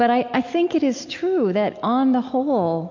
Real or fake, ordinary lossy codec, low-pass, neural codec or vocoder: real; MP3, 48 kbps; 7.2 kHz; none